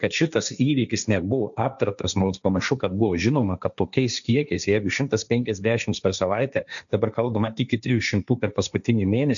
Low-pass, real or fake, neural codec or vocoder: 7.2 kHz; fake; codec, 16 kHz, 1.1 kbps, Voila-Tokenizer